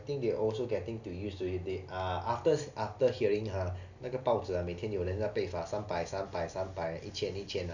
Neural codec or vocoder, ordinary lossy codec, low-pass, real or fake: none; none; 7.2 kHz; real